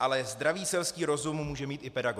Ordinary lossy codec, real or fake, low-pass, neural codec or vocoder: MP3, 96 kbps; real; 14.4 kHz; none